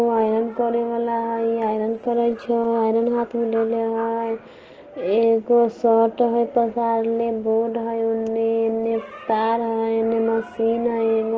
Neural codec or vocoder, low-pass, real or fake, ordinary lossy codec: none; 7.2 kHz; real; Opus, 16 kbps